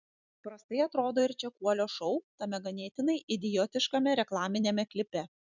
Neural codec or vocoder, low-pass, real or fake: vocoder, 44.1 kHz, 128 mel bands every 256 samples, BigVGAN v2; 7.2 kHz; fake